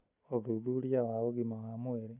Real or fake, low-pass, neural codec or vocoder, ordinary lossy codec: real; 3.6 kHz; none; none